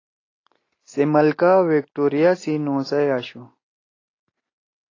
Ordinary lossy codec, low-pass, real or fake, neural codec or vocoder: AAC, 32 kbps; 7.2 kHz; real; none